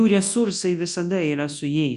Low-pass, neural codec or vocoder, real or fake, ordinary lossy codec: 10.8 kHz; codec, 24 kHz, 0.9 kbps, WavTokenizer, large speech release; fake; MP3, 64 kbps